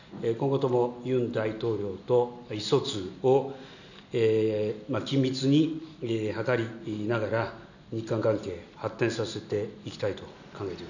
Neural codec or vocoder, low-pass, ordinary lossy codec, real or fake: none; 7.2 kHz; none; real